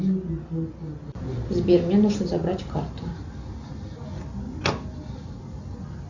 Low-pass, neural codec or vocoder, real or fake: 7.2 kHz; none; real